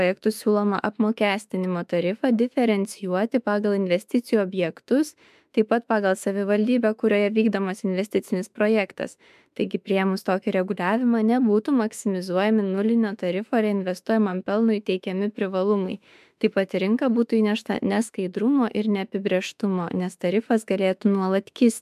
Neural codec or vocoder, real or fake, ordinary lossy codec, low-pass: autoencoder, 48 kHz, 32 numbers a frame, DAC-VAE, trained on Japanese speech; fake; MP3, 96 kbps; 14.4 kHz